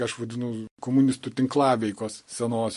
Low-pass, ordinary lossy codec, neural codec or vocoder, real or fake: 14.4 kHz; MP3, 48 kbps; none; real